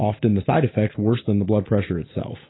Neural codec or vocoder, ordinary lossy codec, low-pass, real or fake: none; AAC, 16 kbps; 7.2 kHz; real